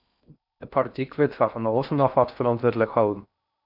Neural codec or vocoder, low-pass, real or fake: codec, 16 kHz in and 24 kHz out, 0.6 kbps, FocalCodec, streaming, 4096 codes; 5.4 kHz; fake